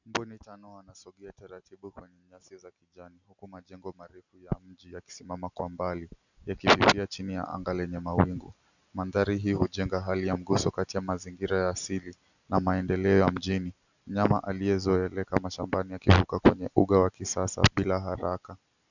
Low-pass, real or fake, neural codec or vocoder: 7.2 kHz; real; none